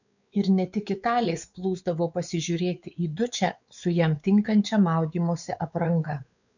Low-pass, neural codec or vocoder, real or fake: 7.2 kHz; codec, 16 kHz, 4 kbps, X-Codec, WavLM features, trained on Multilingual LibriSpeech; fake